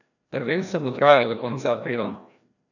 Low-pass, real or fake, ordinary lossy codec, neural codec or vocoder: 7.2 kHz; fake; none; codec, 16 kHz, 1 kbps, FreqCodec, larger model